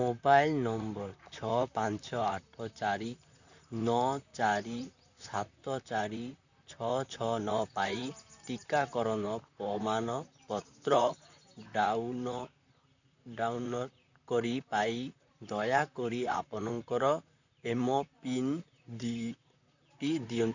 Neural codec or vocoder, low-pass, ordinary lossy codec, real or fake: vocoder, 44.1 kHz, 128 mel bands, Pupu-Vocoder; 7.2 kHz; none; fake